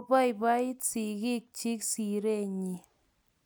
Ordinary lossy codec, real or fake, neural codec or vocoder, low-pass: none; real; none; none